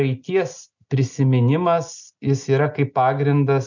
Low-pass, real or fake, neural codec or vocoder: 7.2 kHz; real; none